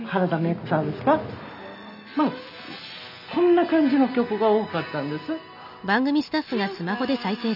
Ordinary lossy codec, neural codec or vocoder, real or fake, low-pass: none; none; real; 5.4 kHz